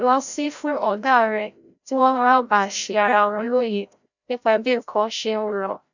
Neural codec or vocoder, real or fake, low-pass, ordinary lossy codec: codec, 16 kHz, 0.5 kbps, FreqCodec, larger model; fake; 7.2 kHz; none